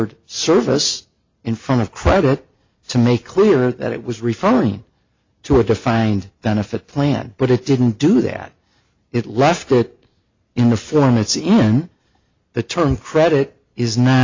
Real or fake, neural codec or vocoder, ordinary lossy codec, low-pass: real; none; MP3, 48 kbps; 7.2 kHz